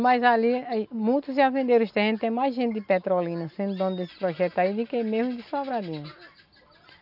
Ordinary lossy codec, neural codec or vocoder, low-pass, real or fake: none; none; 5.4 kHz; real